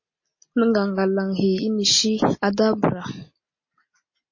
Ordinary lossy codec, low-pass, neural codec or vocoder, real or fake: MP3, 32 kbps; 7.2 kHz; none; real